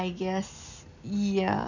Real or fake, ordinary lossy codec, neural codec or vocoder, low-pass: real; none; none; 7.2 kHz